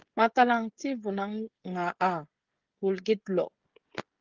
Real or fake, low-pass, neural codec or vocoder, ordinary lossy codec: fake; 7.2 kHz; codec, 16 kHz, 16 kbps, FreqCodec, smaller model; Opus, 16 kbps